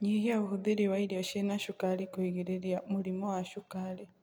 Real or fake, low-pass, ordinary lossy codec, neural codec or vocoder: real; none; none; none